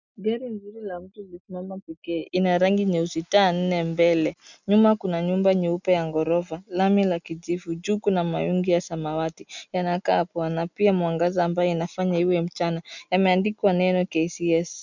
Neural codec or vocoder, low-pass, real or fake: none; 7.2 kHz; real